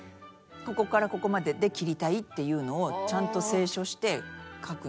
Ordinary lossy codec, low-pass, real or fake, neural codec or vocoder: none; none; real; none